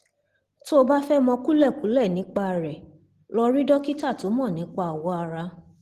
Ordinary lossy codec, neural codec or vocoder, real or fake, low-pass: Opus, 16 kbps; none; real; 14.4 kHz